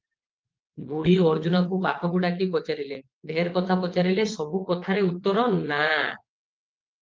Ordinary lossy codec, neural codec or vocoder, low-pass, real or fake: Opus, 32 kbps; vocoder, 22.05 kHz, 80 mel bands, WaveNeXt; 7.2 kHz; fake